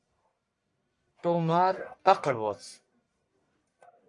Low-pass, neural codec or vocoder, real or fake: 10.8 kHz; codec, 44.1 kHz, 1.7 kbps, Pupu-Codec; fake